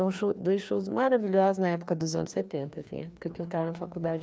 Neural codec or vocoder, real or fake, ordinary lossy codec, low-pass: codec, 16 kHz, 2 kbps, FreqCodec, larger model; fake; none; none